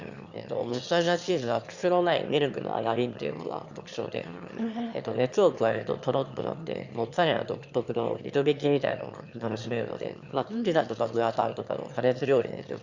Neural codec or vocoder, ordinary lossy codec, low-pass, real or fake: autoencoder, 22.05 kHz, a latent of 192 numbers a frame, VITS, trained on one speaker; Opus, 64 kbps; 7.2 kHz; fake